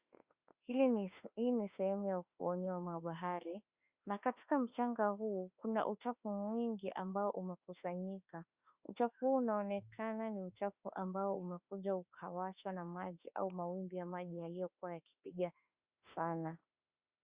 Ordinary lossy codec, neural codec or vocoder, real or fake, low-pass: Opus, 64 kbps; autoencoder, 48 kHz, 32 numbers a frame, DAC-VAE, trained on Japanese speech; fake; 3.6 kHz